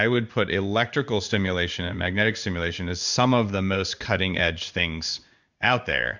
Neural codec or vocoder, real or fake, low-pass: codec, 16 kHz in and 24 kHz out, 1 kbps, XY-Tokenizer; fake; 7.2 kHz